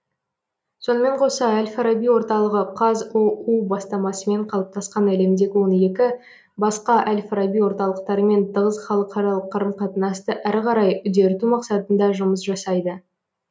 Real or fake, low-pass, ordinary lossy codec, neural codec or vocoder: real; none; none; none